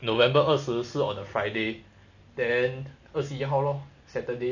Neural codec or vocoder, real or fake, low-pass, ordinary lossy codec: none; real; 7.2 kHz; none